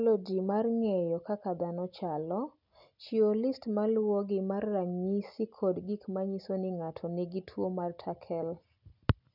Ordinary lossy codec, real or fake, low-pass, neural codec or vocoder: none; real; 5.4 kHz; none